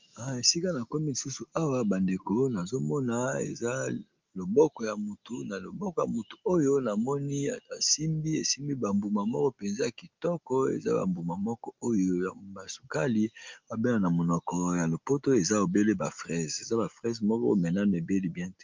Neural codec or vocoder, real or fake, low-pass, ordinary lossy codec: none; real; 7.2 kHz; Opus, 24 kbps